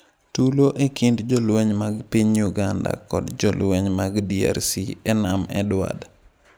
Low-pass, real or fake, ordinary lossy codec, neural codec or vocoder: none; real; none; none